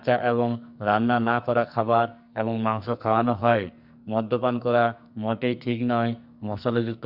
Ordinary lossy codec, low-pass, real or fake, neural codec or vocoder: Opus, 64 kbps; 5.4 kHz; fake; codec, 44.1 kHz, 2.6 kbps, SNAC